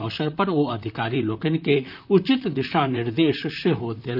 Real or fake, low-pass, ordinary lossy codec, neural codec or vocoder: fake; 5.4 kHz; none; vocoder, 44.1 kHz, 128 mel bands, Pupu-Vocoder